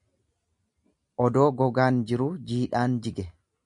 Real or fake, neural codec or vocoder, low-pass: real; none; 10.8 kHz